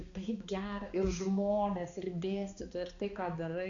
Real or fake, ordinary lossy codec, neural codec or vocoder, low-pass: fake; Opus, 64 kbps; codec, 16 kHz, 2 kbps, X-Codec, HuBERT features, trained on balanced general audio; 7.2 kHz